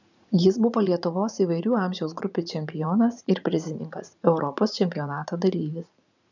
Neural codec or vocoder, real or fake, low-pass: vocoder, 44.1 kHz, 80 mel bands, Vocos; fake; 7.2 kHz